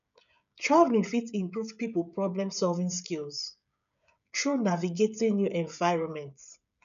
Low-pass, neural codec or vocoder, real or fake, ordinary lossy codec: 7.2 kHz; codec, 16 kHz, 16 kbps, FreqCodec, smaller model; fake; MP3, 96 kbps